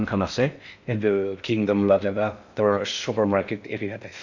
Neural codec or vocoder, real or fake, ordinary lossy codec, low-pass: codec, 16 kHz in and 24 kHz out, 0.6 kbps, FocalCodec, streaming, 4096 codes; fake; none; 7.2 kHz